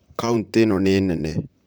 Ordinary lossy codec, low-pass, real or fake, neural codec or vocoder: none; none; fake; vocoder, 44.1 kHz, 128 mel bands, Pupu-Vocoder